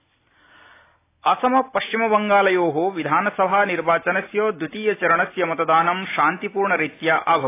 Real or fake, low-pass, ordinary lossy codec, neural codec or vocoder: real; 3.6 kHz; AAC, 24 kbps; none